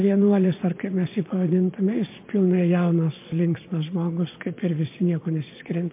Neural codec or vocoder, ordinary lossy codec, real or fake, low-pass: vocoder, 22.05 kHz, 80 mel bands, Vocos; MP3, 24 kbps; fake; 3.6 kHz